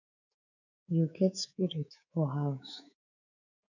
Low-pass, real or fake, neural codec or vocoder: 7.2 kHz; fake; codec, 24 kHz, 3.1 kbps, DualCodec